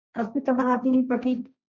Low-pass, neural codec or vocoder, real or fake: 7.2 kHz; codec, 16 kHz, 1.1 kbps, Voila-Tokenizer; fake